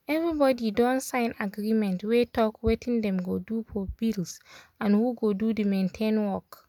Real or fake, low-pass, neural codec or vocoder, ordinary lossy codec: real; 19.8 kHz; none; none